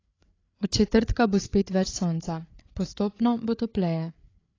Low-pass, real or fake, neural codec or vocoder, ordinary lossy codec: 7.2 kHz; fake; codec, 16 kHz, 8 kbps, FreqCodec, larger model; AAC, 32 kbps